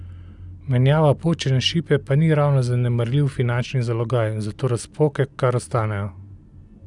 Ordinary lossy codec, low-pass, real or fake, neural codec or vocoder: none; 10.8 kHz; real; none